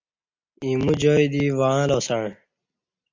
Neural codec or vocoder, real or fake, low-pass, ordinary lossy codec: none; real; 7.2 kHz; MP3, 64 kbps